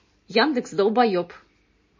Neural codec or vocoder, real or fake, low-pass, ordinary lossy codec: none; real; 7.2 kHz; MP3, 32 kbps